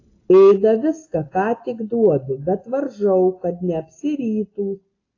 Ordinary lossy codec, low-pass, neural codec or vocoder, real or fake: AAC, 32 kbps; 7.2 kHz; none; real